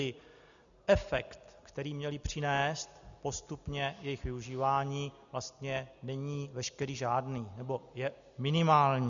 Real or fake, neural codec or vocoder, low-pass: real; none; 7.2 kHz